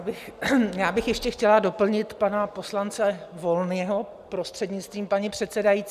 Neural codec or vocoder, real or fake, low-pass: none; real; 14.4 kHz